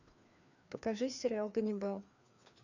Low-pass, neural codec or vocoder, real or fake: 7.2 kHz; codec, 16 kHz, 2 kbps, FreqCodec, larger model; fake